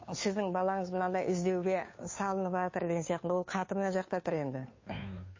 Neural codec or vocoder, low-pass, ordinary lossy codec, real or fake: codec, 16 kHz, 2 kbps, FunCodec, trained on Chinese and English, 25 frames a second; 7.2 kHz; MP3, 32 kbps; fake